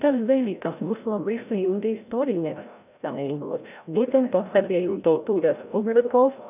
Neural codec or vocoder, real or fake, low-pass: codec, 16 kHz, 0.5 kbps, FreqCodec, larger model; fake; 3.6 kHz